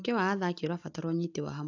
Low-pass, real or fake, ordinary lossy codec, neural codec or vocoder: 7.2 kHz; real; AAC, 48 kbps; none